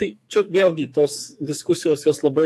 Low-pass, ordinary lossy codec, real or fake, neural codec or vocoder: 14.4 kHz; AAC, 64 kbps; fake; codec, 44.1 kHz, 2.6 kbps, SNAC